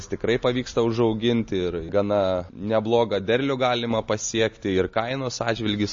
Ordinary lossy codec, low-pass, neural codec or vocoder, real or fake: MP3, 32 kbps; 7.2 kHz; none; real